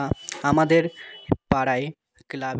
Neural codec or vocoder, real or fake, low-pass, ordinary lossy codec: none; real; none; none